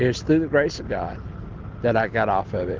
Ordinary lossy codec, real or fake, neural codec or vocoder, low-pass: Opus, 16 kbps; real; none; 7.2 kHz